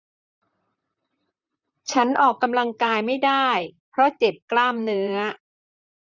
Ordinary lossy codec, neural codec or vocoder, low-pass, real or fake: none; vocoder, 44.1 kHz, 128 mel bands, Pupu-Vocoder; 7.2 kHz; fake